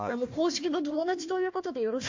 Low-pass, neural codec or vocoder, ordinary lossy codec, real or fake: 7.2 kHz; codec, 16 kHz, 1 kbps, FunCodec, trained on Chinese and English, 50 frames a second; MP3, 48 kbps; fake